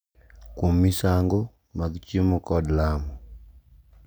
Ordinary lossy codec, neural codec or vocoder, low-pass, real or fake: none; none; none; real